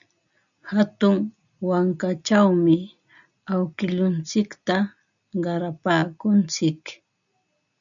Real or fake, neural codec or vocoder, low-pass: real; none; 7.2 kHz